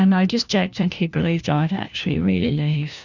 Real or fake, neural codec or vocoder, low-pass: fake; codec, 16 kHz, 1 kbps, FunCodec, trained on LibriTTS, 50 frames a second; 7.2 kHz